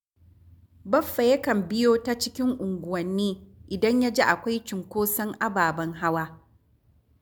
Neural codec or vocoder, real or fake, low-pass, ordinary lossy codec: none; real; none; none